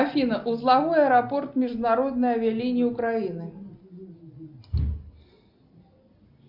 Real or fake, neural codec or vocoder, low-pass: real; none; 5.4 kHz